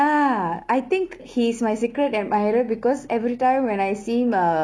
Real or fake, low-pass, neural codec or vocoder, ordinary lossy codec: real; none; none; none